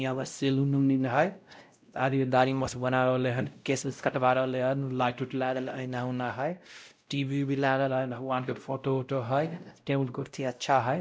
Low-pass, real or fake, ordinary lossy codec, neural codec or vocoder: none; fake; none; codec, 16 kHz, 0.5 kbps, X-Codec, WavLM features, trained on Multilingual LibriSpeech